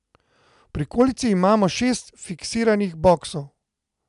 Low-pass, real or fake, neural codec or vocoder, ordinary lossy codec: 10.8 kHz; real; none; none